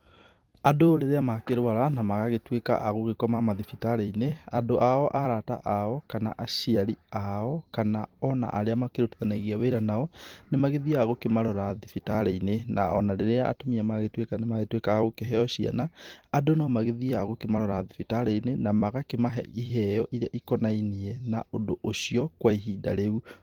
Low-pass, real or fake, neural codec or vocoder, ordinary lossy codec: 19.8 kHz; fake; vocoder, 44.1 kHz, 128 mel bands every 256 samples, BigVGAN v2; Opus, 24 kbps